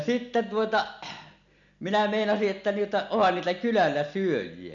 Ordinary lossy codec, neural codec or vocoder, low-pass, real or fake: none; none; 7.2 kHz; real